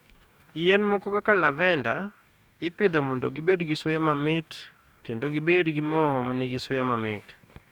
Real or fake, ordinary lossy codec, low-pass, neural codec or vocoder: fake; none; 19.8 kHz; codec, 44.1 kHz, 2.6 kbps, DAC